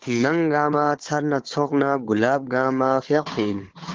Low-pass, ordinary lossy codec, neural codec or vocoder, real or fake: 7.2 kHz; Opus, 16 kbps; codec, 16 kHz, 4 kbps, FunCodec, trained on Chinese and English, 50 frames a second; fake